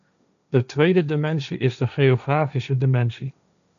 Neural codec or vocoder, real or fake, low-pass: codec, 16 kHz, 1.1 kbps, Voila-Tokenizer; fake; 7.2 kHz